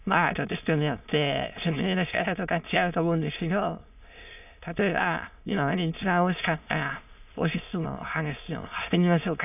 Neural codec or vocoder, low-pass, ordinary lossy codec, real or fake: autoencoder, 22.05 kHz, a latent of 192 numbers a frame, VITS, trained on many speakers; 3.6 kHz; none; fake